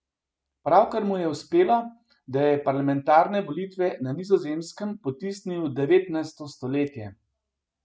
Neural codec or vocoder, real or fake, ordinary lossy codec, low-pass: none; real; none; none